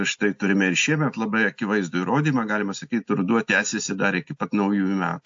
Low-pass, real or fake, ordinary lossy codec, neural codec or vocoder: 7.2 kHz; real; AAC, 64 kbps; none